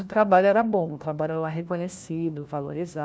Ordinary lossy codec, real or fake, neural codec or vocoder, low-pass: none; fake; codec, 16 kHz, 1 kbps, FunCodec, trained on LibriTTS, 50 frames a second; none